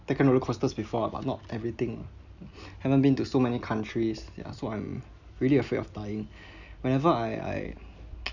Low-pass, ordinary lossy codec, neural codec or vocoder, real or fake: 7.2 kHz; none; none; real